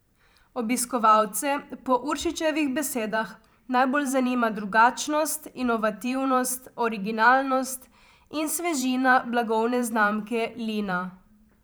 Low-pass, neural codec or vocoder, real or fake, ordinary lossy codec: none; vocoder, 44.1 kHz, 128 mel bands every 512 samples, BigVGAN v2; fake; none